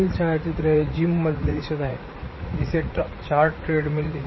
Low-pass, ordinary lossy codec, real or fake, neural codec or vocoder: 7.2 kHz; MP3, 24 kbps; fake; vocoder, 44.1 kHz, 80 mel bands, Vocos